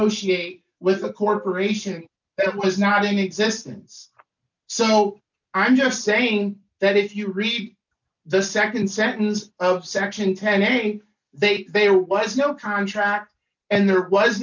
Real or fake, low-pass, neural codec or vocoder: real; 7.2 kHz; none